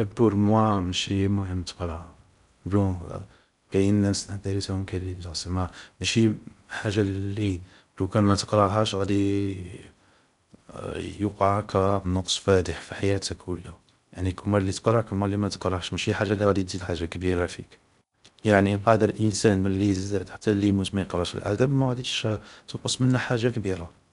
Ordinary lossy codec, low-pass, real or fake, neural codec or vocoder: none; 10.8 kHz; fake; codec, 16 kHz in and 24 kHz out, 0.6 kbps, FocalCodec, streaming, 2048 codes